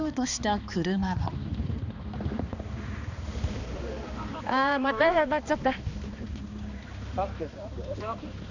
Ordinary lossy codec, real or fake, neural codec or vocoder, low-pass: none; fake; codec, 16 kHz, 2 kbps, X-Codec, HuBERT features, trained on balanced general audio; 7.2 kHz